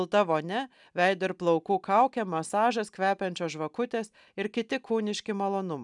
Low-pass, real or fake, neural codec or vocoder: 10.8 kHz; real; none